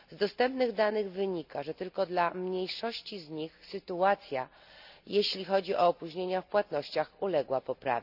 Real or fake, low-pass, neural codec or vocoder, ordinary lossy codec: real; 5.4 kHz; none; none